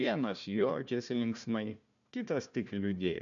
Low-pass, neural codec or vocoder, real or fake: 7.2 kHz; codec, 16 kHz, 1 kbps, FunCodec, trained on Chinese and English, 50 frames a second; fake